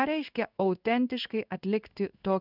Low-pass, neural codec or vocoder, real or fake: 5.4 kHz; codec, 16 kHz in and 24 kHz out, 1 kbps, XY-Tokenizer; fake